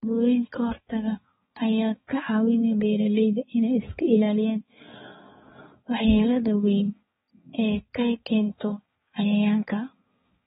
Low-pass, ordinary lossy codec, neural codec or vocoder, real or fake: 7.2 kHz; AAC, 16 kbps; codec, 16 kHz, 4 kbps, X-Codec, HuBERT features, trained on balanced general audio; fake